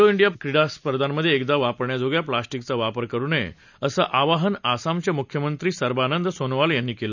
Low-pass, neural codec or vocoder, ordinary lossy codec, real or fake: 7.2 kHz; none; none; real